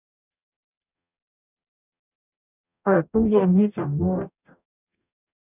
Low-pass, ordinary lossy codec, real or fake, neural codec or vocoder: 3.6 kHz; none; fake; codec, 44.1 kHz, 0.9 kbps, DAC